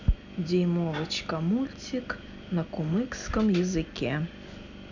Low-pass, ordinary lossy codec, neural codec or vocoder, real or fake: 7.2 kHz; none; none; real